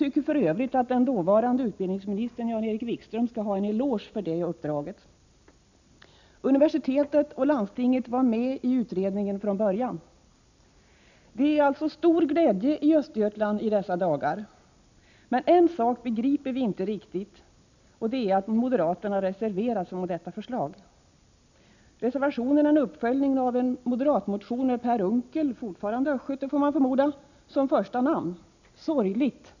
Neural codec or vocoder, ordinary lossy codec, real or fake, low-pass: none; none; real; 7.2 kHz